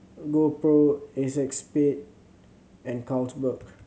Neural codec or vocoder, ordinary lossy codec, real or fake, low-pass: none; none; real; none